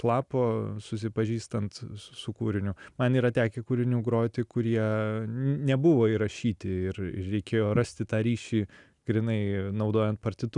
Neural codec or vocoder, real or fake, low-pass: none; real; 10.8 kHz